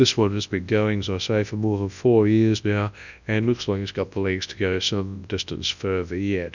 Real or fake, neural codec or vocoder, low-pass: fake; codec, 24 kHz, 0.9 kbps, WavTokenizer, large speech release; 7.2 kHz